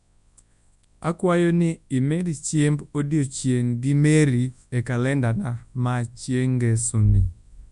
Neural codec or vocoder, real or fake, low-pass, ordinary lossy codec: codec, 24 kHz, 0.9 kbps, WavTokenizer, large speech release; fake; 10.8 kHz; none